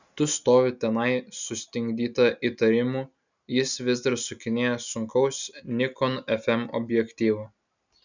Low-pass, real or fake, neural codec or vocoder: 7.2 kHz; real; none